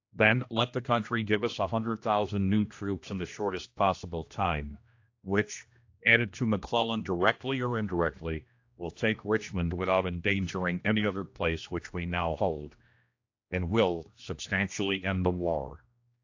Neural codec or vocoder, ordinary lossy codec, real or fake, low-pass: codec, 16 kHz, 1 kbps, X-Codec, HuBERT features, trained on general audio; AAC, 48 kbps; fake; 7.2 kHz